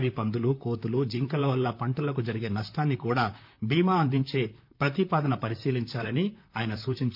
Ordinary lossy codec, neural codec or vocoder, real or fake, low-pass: none; vocoder, 44.1 kHz, 128 mel bands, Pupu-Vocoder; fake; 5.4 kHz